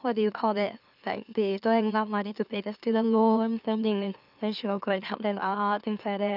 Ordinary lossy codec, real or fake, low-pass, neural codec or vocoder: none; fake; 5.4 kHz; autoencoder, 44.1 kHz, a latent of 192 numbers a frame, MeloTTS